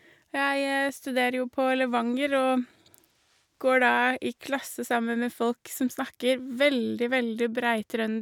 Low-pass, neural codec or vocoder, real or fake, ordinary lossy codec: 19.8 kHz; none; real; none